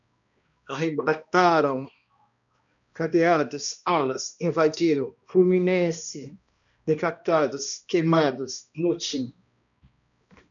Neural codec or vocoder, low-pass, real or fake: codec, 16 kHz, 1 kbps, X-Codec, HuBERT features, trained on balanced general audio; 7.2 kHz; fake